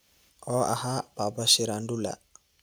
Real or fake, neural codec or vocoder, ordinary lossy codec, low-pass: real; none; none; none